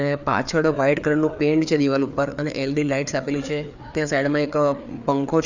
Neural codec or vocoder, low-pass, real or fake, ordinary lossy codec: codec, 16 kHz, 4 kbps, FreqCodec, larger model; 7.2 kHz; fake; none